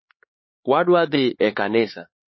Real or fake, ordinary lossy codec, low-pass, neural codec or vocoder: fake; MP3, 24 kbps; 7.2 kHz; codec, 16 kHz, 4 kbps, X-Codec, HuBERT features, trained on LibriSpeech